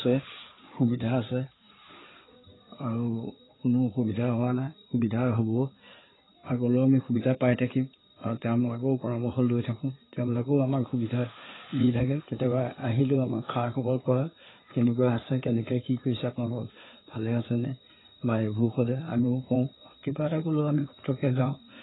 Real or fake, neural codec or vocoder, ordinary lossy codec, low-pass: fake; codec, 16 kHz in and 24 kHz out, 2.2 kbps, FireRedTTS-2 codec; AAC, 16 kbps; 7.2 kHz